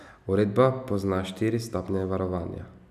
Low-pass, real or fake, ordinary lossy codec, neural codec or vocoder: 14.4 kHz; real; none; none